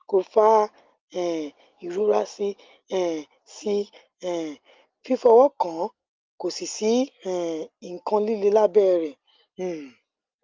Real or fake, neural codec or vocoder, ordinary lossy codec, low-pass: real; none; Opus, 32 kbps; 7.2 kHz